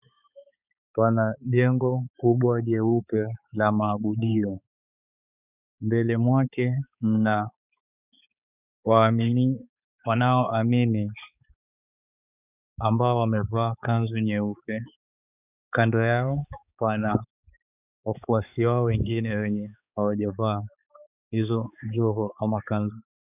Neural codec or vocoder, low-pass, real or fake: codec, 16 kHz, 4 kbps, X-Codec, HuBERT features, trained on balanced general audio; 3.6 kHz; fake